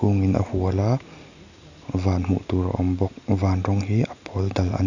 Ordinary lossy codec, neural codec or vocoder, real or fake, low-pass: none; none; real; 7.2 kHz